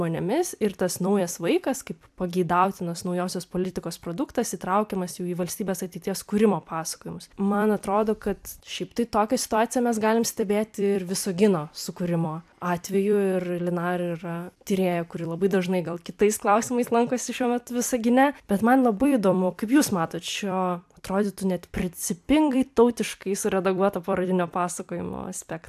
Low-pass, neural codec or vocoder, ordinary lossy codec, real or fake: 14.4 kHz; vocoder, 44.1 kHz, 128 mel bands every 256 samples, BigVGAN v2; AAC, 96 kbps; fake